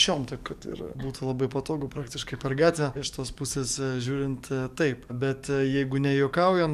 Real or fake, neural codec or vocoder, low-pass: fake; codec, 44.1 kHz, 7.8 kbps, DAC; 14.4 kHz